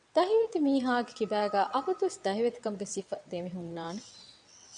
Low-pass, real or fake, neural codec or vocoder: 9.9 kHz; fake; vocoder, 22.05 kHz, 80 mel bands, WaveNeXt